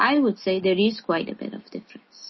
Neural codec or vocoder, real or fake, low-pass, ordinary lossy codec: none; real; 7.2 kHz; MP3, 24 kbps